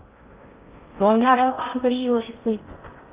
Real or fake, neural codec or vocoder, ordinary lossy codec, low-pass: fake; codec, 16 kHz in and 24 kHz out, 0.6 kbps, FocalCodec, streaming, 4096 codes; Opus, 32 kbps; 3.6 kHz